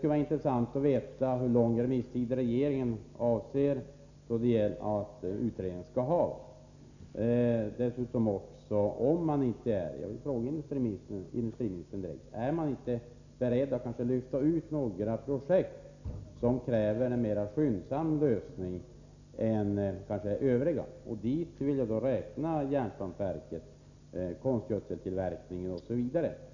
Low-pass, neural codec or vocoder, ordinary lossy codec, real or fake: 7.2 kHz; none; none; real